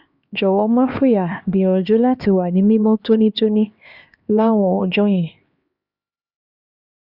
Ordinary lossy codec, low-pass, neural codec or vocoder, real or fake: Opus, 64 kbps; 5.4 kHz; codec, 16 kHz, 2 kbps, X-Codec, HuBERT features, trained on LibriSpeech; fake